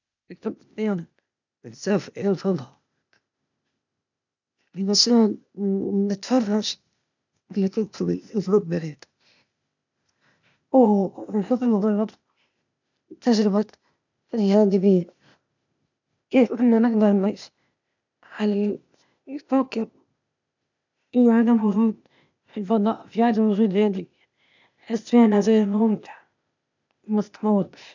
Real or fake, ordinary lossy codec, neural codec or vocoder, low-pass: fake; none; codec, 16 kHz, 0.8 kbps, ZipCodec; 7.2 kHz